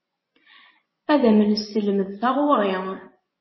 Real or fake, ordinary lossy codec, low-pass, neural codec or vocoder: fake; MP3, 24 kbps; 7.2 kHz; vocoder, 24 kHz, 100 mel bands, Vocos